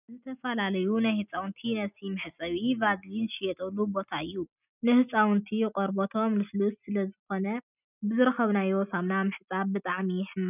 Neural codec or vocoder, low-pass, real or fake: none; 3.6 kHz; real